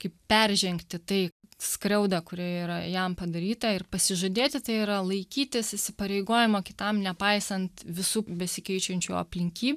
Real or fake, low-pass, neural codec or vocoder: real; 14.4 kHz; none